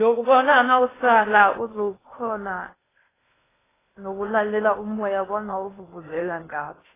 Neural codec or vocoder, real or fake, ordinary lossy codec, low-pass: codec, 16 kHz in and 24 kHz out, 0.6 kbps, FocalCodec, streaming, 2048 codes; fake; AAC, 16 kbps; 3.6 kHz